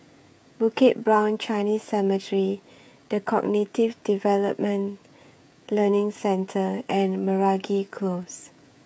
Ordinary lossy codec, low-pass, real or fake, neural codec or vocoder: none; none; fake; codec, 16 kHz, 16 kbps, FreqCodec, smaller model